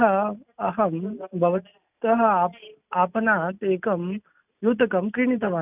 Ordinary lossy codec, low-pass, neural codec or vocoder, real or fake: none; 3.6 kHz; none; real